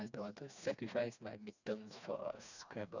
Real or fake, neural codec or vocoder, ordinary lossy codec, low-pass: fake; codec, 16 kHz, 2 kbps, FreqCodec, smaller model; none; 7.2 kHz